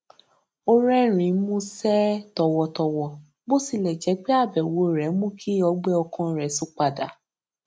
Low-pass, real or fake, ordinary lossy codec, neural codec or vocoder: none; real; none; none